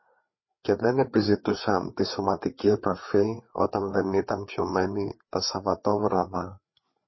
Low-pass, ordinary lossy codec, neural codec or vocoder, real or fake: 7.2 kHz; MP3, 24 kbps; codec, 16 kHz, 4 kbps, FreqCodec, larger model; fake